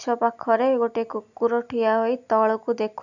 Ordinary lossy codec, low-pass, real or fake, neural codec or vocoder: none; 7.2 kHz; real; none